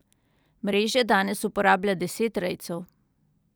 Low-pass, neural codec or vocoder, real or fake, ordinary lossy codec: none; none; real; none